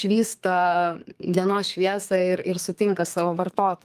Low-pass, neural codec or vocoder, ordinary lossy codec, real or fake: 14.4 kHz; codec, 32 kHz, 1.9 kbps, SNAC; Opus, 32 kbps; fake